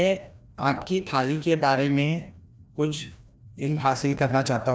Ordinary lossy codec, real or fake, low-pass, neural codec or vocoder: none; fake; none; codec, 16 kHz, 1 kbps, FreqCodec, larger model